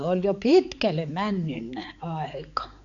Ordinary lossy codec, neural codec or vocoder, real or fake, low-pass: none; codec, 16 kHz, 4 kbps, X-Codec, HuBERT features, trained on balanced general audio; fake; 7.2 kHz